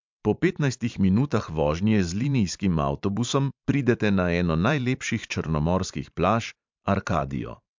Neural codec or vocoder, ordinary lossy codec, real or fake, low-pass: vocoder, 44.1 kHz, 128 mel bands every 512 samples, BigVGAN v2; MP3, 64 kbps; fake; 7.2 kHz